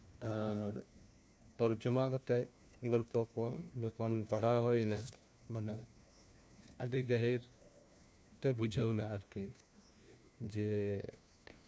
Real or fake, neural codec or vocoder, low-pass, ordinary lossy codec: fake; codec, 16 kHz, 1 kbps, FunCodec, trained on LibriTTS, 50 frames a second; none; none